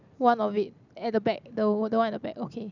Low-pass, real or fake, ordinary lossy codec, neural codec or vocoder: 7.2 kHz; real; none; none